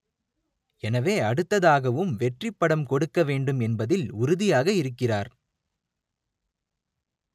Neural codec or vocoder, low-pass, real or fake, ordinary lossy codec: none; 14.4 kHz; real; none